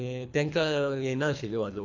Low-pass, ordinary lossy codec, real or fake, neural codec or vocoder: 7.2 kHz; AAC, 48 kbps; fake; codec, 24 kHz, 3 kbps, HILCodec